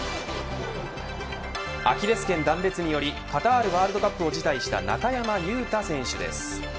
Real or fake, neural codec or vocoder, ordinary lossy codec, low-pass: real; none; none; none